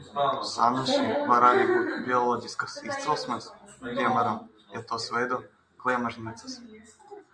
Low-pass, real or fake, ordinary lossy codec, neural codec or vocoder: 9.9 kHz; real; Opus, 64 kbps; none